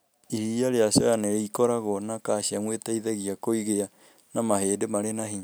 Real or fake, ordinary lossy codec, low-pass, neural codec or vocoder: real; none; none; none